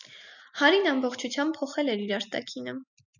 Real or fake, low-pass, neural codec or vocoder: real; 7.2 kHz; none